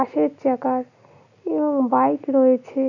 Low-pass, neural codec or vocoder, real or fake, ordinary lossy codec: 7.2 kHz; none; real; none